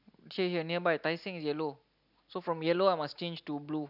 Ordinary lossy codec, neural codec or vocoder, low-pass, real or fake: none; none; 5.4 kHz; real